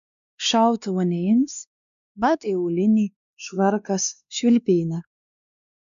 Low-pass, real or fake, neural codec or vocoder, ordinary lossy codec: 7.2 kHz; fake; codec, 16 kHz, 2 kbps, X-Codec, WavLM features, trained on Multilingual LibriSpeech; MP3, 96 kbps